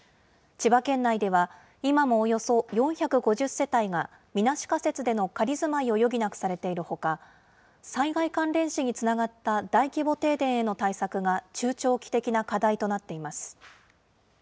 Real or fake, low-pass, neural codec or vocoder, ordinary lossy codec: real; none; none; none